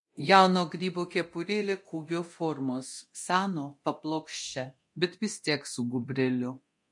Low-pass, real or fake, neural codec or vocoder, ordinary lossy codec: 10.8 kHz; fake; codec, 24 kHz, 0.9 kbps, DualCodec; MP3, 48 kbps